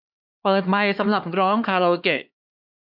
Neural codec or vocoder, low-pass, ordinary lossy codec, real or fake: codec, 16 kHz, 2 kbps, X-Codec, HuBERT features, trained on LibriSpeech; 5.4 kHz; none; fake